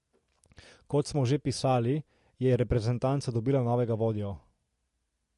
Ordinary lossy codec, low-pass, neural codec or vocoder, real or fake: MP3, 48 kbps; 14.4 kHz; none; real